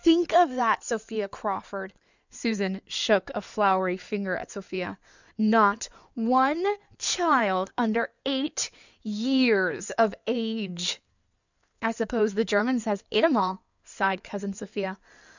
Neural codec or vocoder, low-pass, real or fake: codec, 16 kHz in and 24 kHz out, 2.2 kbps, FireRedTTS-2 codec; 7.2 kHz; fake